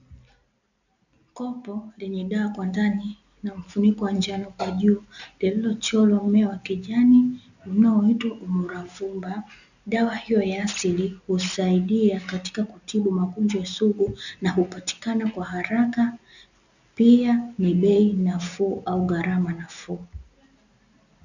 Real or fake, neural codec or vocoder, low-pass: real; none; 7.2 kHz